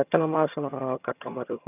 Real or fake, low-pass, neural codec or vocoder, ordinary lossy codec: fake; 3.6 kHz; vocoder, 22.05 kHz, 80 mel bands, HiFi-GAN; none